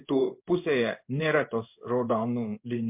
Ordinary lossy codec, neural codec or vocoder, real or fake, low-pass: MP3, 32 kbps; codec, 16 kHz in and 24 kHz out, 1 kbps, XY-Tokenizer; fake; 3.6 kHz